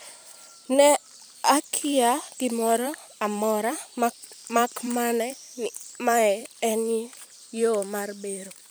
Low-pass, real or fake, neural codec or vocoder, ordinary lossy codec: none; fake; vocoder, 44.1 kHz, 128 mel bands every 512 samples, BigVGAN v2; none